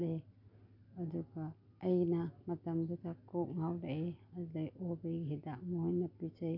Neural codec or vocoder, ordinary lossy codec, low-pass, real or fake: none; none; 5.4 kHz; real